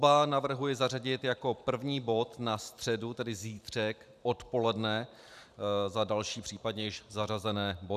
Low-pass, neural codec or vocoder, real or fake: 14.4 kHz; vocoder, 44.1 kHz, 128 mel bands every 512 samples, BigVGAN v2; fake